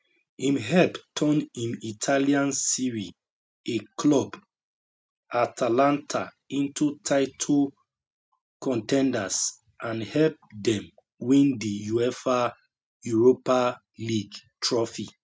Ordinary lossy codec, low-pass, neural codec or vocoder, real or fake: none; none; none; real